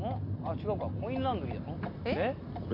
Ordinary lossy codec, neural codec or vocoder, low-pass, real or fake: MP3, 32 kbps; none; 5.4 kHz; real